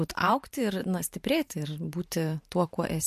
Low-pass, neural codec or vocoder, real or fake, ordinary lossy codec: 14.4 kHz; vocoder, 44.1 kHz, 128 mel bands every 512 samples, BigVGAN v2; fake; MP3, 64 kbps